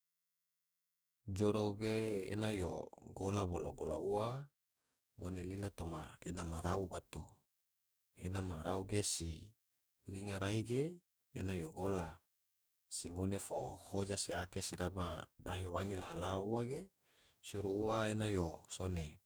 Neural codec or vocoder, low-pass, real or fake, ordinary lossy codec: codec, 44.1 kHz, 2.6 kbps, DAC; none; fake; none